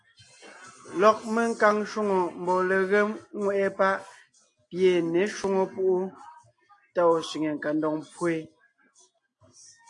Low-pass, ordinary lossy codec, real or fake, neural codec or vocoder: 10.8 kHz; AAC, 48 kbps; real; none